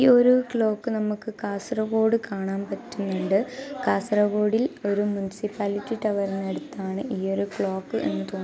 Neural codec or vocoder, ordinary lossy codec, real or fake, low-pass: none; none; real; none